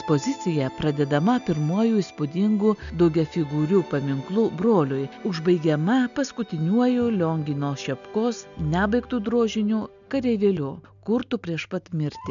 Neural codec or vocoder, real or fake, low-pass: none; real; 7.2 kHz